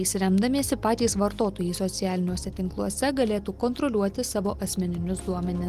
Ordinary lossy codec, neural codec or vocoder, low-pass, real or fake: Opus, 24 kbps; none; 14.4 kHz; real